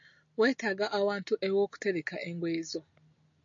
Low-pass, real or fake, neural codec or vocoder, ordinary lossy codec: 7.2 kHz; real; none; MP3, 48 kbps